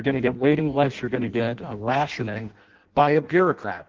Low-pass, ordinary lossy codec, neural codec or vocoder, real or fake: 7.2 kHz; Opus, 16 kbps; codec, 16 kHz in and 24 kHz out, 0.6 kbps, FireRedTTS-2 codec; fake